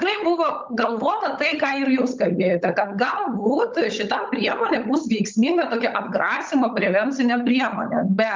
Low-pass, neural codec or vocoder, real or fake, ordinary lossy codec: 7.2 kHz; codec, 16 kHz, 16 kbps, FunCodec, trained on LibriTTS, 50 frames a second; fake; Opus, 24 kbps